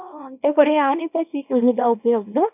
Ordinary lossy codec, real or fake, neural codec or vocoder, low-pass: none; fake; codec, 24 kHz, 0.9 kbps, WavTokenizer, small release; 3.6 kHz